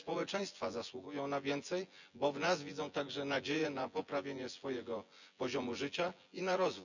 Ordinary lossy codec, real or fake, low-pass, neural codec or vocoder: none; fake; 7.2 kHz; vocoder, 24 kHz, 100 mel bands, Vocos